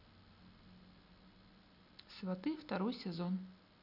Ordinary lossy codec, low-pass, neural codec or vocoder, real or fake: none; 5.4 kHz; none; real